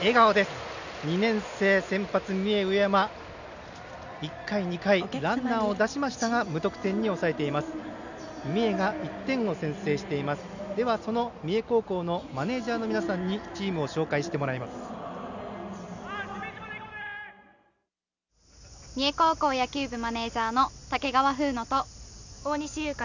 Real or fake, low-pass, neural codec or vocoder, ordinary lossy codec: real; 7.2 kHz; none; none